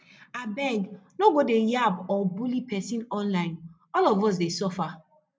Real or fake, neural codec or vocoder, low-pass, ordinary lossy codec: real; none; none; none